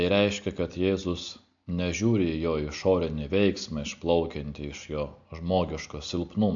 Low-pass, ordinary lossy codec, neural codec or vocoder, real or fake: 7.2 kHz; AAC, 64 kbps; none; real